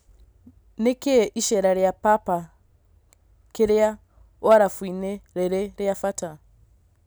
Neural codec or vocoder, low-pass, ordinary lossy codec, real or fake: none; none; none; real